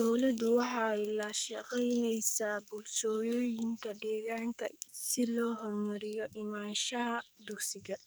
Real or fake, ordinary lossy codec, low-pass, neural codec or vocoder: fake; none; none; codec, 44.1 kHz, 2.6 kbps, SNAC